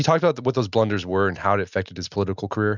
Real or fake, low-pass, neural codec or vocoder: real; 7.2 kHz; none